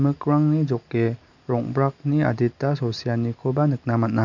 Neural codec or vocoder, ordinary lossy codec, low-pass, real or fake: none; none; 7.2 kHz; real